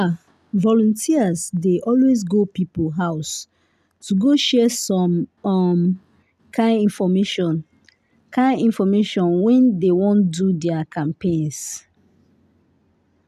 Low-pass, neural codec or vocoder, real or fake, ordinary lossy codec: 14.4 kHz; none; real; none